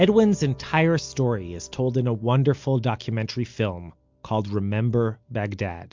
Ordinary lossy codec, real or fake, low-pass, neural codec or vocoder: MP3, 64 kbps; real; 7.2 kHz; none